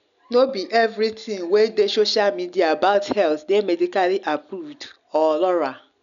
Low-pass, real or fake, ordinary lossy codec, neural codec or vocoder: 7.2 kHz; real; none; none